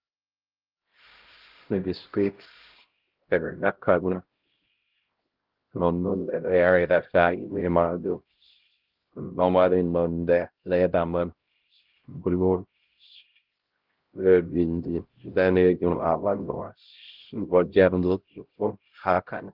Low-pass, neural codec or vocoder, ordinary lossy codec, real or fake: 5.4 kHz; codec, 16 kHz, 0.5 kbps, X-Codec, HuBERT features, trained on LibriSpeech; Opus, 16 kbps; fake